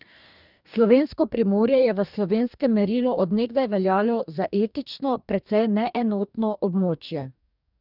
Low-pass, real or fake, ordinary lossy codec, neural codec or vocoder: 5.4 kHz; fake; none; codec, 44.1 kHz, 2.6 kbps, DAC